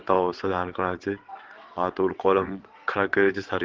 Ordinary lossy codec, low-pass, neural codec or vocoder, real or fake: Opus, 16 kbps; 7.2 kHz; vocoder, 22.05 kHz, 80 mel bands, WaveNeXt; fake